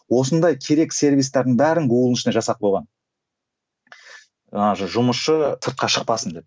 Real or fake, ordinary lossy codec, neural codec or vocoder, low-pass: real; none; none; none